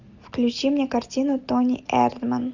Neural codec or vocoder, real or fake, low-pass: none; real; 7.2 kHz